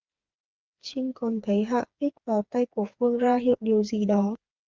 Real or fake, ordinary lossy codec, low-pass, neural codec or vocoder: fake; Opus, 24 kbps; 7.2 kHz; codec, 16 kHz, 4 kbps, FreqCodec, smaller model